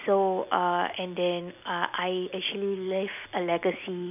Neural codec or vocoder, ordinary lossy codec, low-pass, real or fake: none; none; 3.6 kHz; real